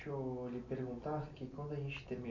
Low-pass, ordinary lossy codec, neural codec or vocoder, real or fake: 7.2 kHz; none; none; real